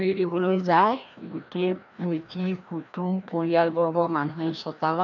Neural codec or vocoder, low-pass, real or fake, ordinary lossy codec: codec, 16 kHz, 1 kbps, FreqCodec, larger model; 7.2 kHz; fake; none